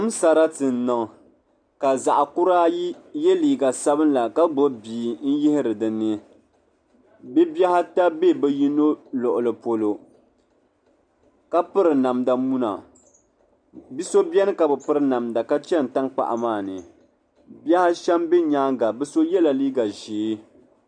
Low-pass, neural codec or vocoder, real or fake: 9.9 kHz; none; real